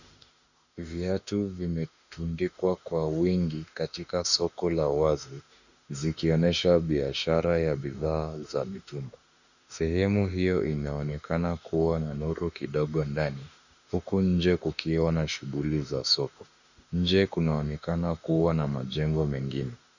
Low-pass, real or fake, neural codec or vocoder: 7.2 kHz; fake; autoencoder, 48 kHz, 32 numbers a frame, DAC-VAE, trained on Japanese speech